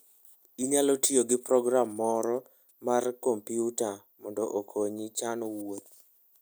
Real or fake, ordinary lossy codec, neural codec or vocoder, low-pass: real; none; none; none